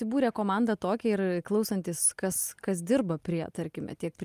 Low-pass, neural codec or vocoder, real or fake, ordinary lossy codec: 14.4 kHz; none; real; Opus, 32 kbps